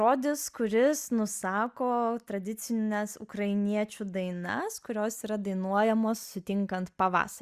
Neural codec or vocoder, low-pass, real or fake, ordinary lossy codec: none; 14.4 kHz; real; Opus, 64 kbps